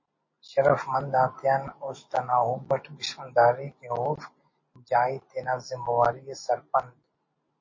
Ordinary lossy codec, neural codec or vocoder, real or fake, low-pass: MP3, 32 kbps; none; real; 7.2 kHz